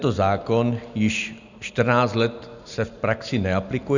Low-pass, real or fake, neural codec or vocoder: 7.2 kHz; real; none